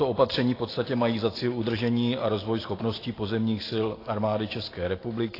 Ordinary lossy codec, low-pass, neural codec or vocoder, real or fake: AAC, 24 kbps; 5.4 kHz; none; real